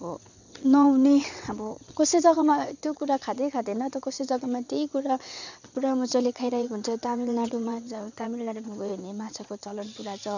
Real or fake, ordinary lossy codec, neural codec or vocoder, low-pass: fake; none; vocoder, 22.05 kHz, 80 mel bands, WaveNeXt; 7.2 kHz